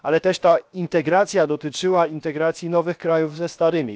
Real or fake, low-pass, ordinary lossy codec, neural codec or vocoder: fake; none; none; codec, 16 kHz, 0.7 kbps, FocalCodec